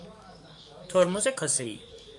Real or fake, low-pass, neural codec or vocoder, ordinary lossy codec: fake; 10.8 kHz; codec, 44.1 kHz, 7.8 kbps, DAC; MP3, 96 kbps